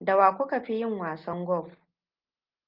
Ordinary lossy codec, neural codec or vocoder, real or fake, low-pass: Opus, 24 kbps; none; real; 5.4 kHz